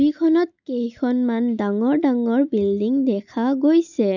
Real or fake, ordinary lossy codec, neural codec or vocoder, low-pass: real; none; none; 7.2 kHz